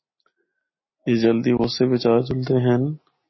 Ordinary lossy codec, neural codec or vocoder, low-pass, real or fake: MP3, 24 kbps; none; 7.2 kHz; real